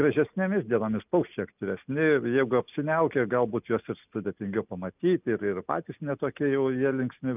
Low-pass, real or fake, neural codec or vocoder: 3.6 kHz; real; none